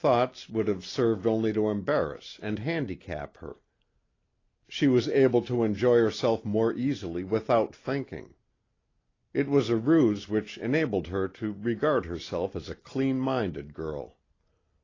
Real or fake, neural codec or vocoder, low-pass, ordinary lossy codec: real; none; 7.2 kHz; AAC, 32 kbps